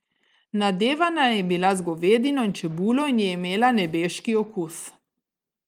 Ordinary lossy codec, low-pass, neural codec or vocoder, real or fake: Opus, 32 kbps; 19.8 kHz; none; real